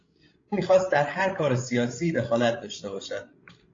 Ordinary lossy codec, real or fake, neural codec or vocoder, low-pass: AAC, 32 kbps; fake; codec, 16 kHz, 16 kbps, FreqCodec, larger model; 7.2 kHz